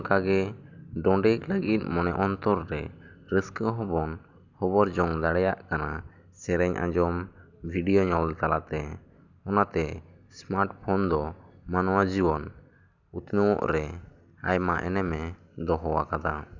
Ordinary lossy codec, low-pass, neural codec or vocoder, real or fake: none; 7.2 kHz; none; real